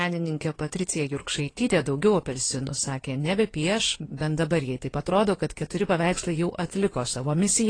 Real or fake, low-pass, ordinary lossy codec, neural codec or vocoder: fake; 9.9 kHz; AAC, 32 kbps; codec, 16 kHz in and 24 kHz out, 2.2 kbps, FireRedTTS-2 codec